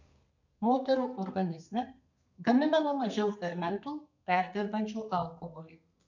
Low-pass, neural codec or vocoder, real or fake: 7.2 kHz; codec, 32 kHz, 1.9 kbps, SNAC; fake